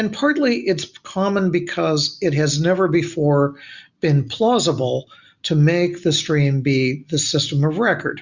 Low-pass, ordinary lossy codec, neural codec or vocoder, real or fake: 7.2 kHz; Opus, 64 kbps; none; real